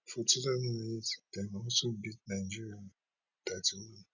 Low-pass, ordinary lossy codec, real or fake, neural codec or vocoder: 7.2 kHz; none; real; none